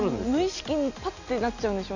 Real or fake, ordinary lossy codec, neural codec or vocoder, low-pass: real; none; none; 7.2 kHz